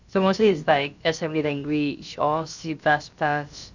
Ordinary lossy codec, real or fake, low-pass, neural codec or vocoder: none; fake; 7.2 kHz; codec, 16 kHz, about 1 kbps, DyCAST, with the encoder's durations